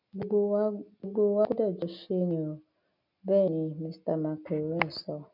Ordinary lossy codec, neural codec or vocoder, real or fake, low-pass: none; none; real; 5.4 kHz